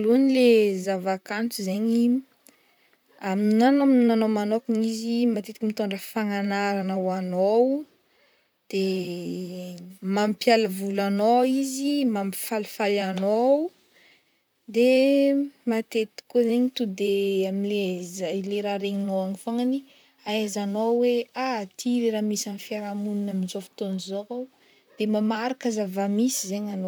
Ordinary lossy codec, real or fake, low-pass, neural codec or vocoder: none; fake; none; vocoder, 44.1 kHz, 128 mel bands, Pupu-Vocoder